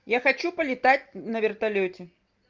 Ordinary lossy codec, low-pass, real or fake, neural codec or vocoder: Opus, 24 kbps; 7.2 kHz; real; none